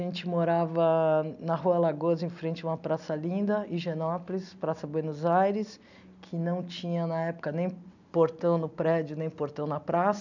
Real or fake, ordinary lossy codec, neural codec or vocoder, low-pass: real; none; none; 7.2 kHz